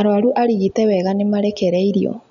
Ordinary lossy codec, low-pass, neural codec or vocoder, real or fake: none; 7.2 kHz; none; real